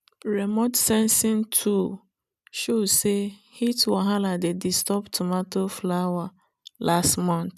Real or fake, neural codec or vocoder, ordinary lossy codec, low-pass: real; none; none; none